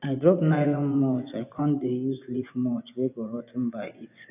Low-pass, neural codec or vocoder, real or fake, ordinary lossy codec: 3.6 kHz; vocoder, 24 kHz, 100 mel bands, Vocos; fake; none